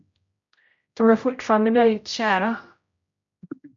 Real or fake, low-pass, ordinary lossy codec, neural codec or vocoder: fake; 7.2 kHz; MP3, 48 kbps; codec, 16 kHz, 0.5 kbps, X-Codec, HuBERT features, trained on general audio